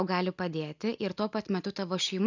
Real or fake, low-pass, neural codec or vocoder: real; 7.2 kHz; none